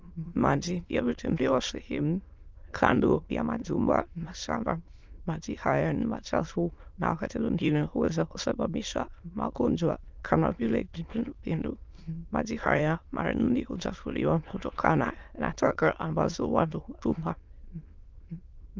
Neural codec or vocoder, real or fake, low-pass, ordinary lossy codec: autoencoder, 22.05 kHz, a latent of 192 numbers a frame, VITS, trained on many speakers; fake; 7.2 kHz; Opus, 24 kbps